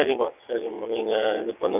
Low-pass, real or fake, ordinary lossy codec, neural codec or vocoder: 3.6 kHz; fake; AAC, 32 kbps; vocoder, 22.05 kHz, 80 mel bands, WaveNeXt